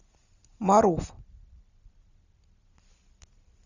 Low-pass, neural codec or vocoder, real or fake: 7.2 kHz; none; real